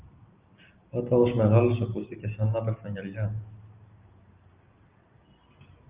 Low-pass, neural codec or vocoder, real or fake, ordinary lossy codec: 3.6 kHz; none; real; Opus, 24 kbps